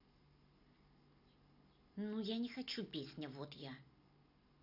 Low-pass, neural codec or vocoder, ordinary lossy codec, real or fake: 5.4 kHz; none; none; real